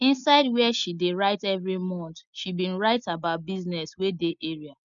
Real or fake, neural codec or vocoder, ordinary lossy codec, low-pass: real; none; none; 7.2 kHz